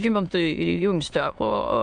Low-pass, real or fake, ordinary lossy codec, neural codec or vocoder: 9.9 kHz; fake; AAC, 64 kbps; autoencoder, 22.05 kHz, a latent of 192 numbers a frame, VITS, trained on many speakers